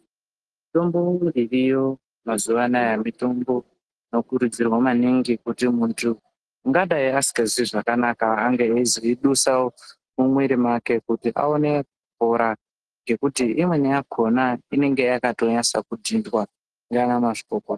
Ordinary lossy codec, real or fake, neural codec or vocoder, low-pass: Opus, 16 kbps; real; none; 10.8 kHz